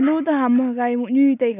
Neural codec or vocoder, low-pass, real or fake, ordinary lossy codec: none; 3.6 kHz; real; none